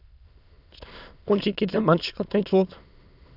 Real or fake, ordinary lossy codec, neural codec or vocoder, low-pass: fake; none; autoencoder, 22.05 kHz, a latent of 192 numbers a frame, VITS, trained on many speakers; 5.4 kHz